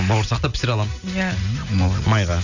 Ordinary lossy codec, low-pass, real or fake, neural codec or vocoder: none; 7.2 kHz; real; none